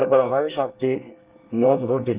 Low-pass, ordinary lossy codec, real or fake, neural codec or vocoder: 3.6 kHz; Opus, 32 kbps; fake; codec, 24 kHz, 1 kbps, SNAC